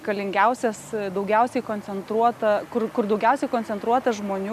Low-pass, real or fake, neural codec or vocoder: 14.4 kHz; real; none